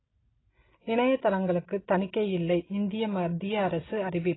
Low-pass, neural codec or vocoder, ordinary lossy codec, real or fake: 7.2 kHz; none; AAC, 16 kbps; real